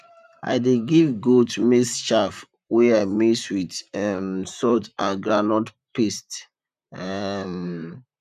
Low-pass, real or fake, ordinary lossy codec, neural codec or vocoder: 14.4 kHz; fake; none; vocoder, 44.1 kHz, 128 mel bands, Pupu-Vocoder